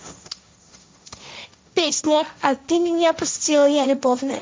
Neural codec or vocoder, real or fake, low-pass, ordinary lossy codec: codec, 16 kHz, 1.1 kbps, Voila-Tokenizer; fake; none; none